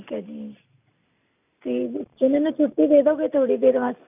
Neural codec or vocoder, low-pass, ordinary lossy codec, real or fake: none; 3.6 kHz; none; real